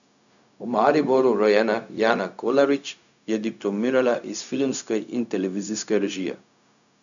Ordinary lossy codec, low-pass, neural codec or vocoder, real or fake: none; 7.2 kHz; codec, 16 kHz, 0.4 kbps, LongCat-Audio-Codec; fake